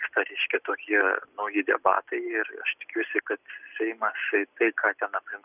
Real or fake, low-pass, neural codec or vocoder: real; 3.6 kHz; none